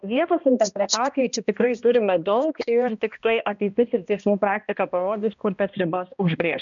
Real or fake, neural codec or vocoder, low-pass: fake; codec, 16 kHz, 1 kbps, X-Codec, HuBERT features, trained on balanced general audio; 7.2 kHz